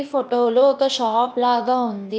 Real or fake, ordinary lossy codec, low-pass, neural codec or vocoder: fake; none; none; codec, 16 kHz, 0.8 kbps, ZipCodec